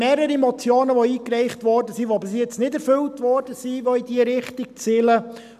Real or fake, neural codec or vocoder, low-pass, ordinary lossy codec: real; none; 14.4 kHz; none